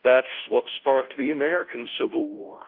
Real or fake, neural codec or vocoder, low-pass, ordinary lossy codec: fake; codec, 16 kHz, 0.5 kbps, FunCodec, trained on Chinese and English, 25 frames a second; 5.4 kHz; Opus, 32 kbps